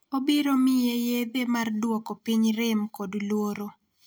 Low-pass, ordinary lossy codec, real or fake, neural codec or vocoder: none; none; real; none